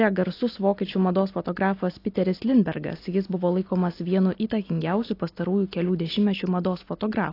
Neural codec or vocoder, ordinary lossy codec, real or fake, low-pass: none; AAC, 32 kbps; real; 5.4 kHz